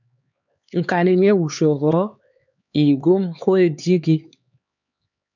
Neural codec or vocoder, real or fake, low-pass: codec, 16 kHz, 4 kbps, X-Codec, HuBERT features, trained on LibriSpeech; fake; 7.2 kHz